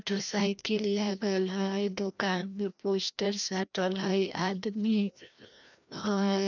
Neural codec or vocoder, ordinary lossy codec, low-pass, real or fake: codec, 16 kHz, 1 kbps, FreqCodec, larger model; none; 7.2 kHz; fake